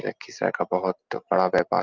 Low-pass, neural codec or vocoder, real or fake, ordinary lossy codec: 7.2 kHz; none; real; Opus, 32 kbps